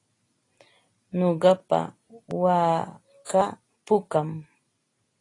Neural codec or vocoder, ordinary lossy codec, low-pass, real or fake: none; AAC, 32 kbps; 10.8 kHz; real